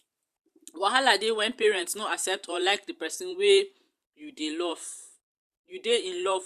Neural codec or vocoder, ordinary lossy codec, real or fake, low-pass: vocoder, 44.1 kHz, 128 mel bands every 512 samples, BigVGAN v2; Opus, 64 kbps; fake; 14.4 kHz